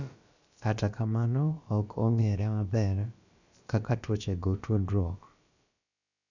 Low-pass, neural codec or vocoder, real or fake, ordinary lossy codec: 7.2 kHz; codec, 16 kHz, about 1 kbps, DyCAST, with the encoder's durations; fake; none